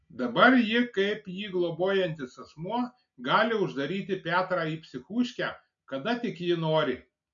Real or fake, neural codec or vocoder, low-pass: real; none; 7.2 kHz